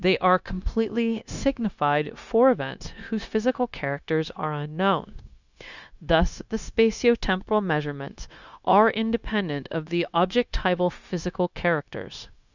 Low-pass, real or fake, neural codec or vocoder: 7.2 kHz; fake; codec, 16 kHz, 0.9 kbps, LongCat-Audio-Codec